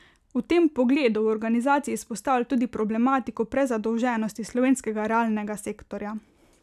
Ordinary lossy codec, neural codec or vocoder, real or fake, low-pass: none; none; real; 14.4 kHz